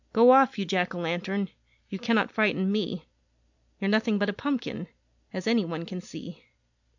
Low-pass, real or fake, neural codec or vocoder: 7.2 kHz; real; none